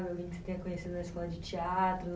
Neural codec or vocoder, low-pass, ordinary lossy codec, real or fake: none; none; none; real